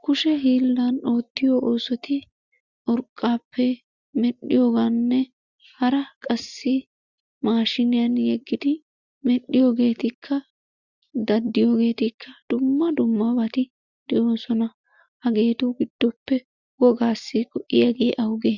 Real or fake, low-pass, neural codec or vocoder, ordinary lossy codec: real; 7.2 kHz; none; Opus, 64 kbps